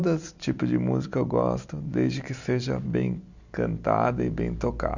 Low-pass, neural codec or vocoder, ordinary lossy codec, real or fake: 7.2 kHz; none; none; real